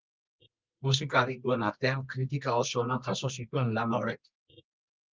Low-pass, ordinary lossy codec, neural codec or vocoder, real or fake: 7.2 kHz; Opus, 24 kbps; codec, 24 kHz, 0.9 kbps, WavTokenizer, medium music audio release; fake